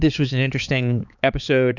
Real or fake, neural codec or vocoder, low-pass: fake; codec, 16 kHz, 4 kbps, X-Codec, HuBERT features, trained on balanced general audio; 7.2 kHz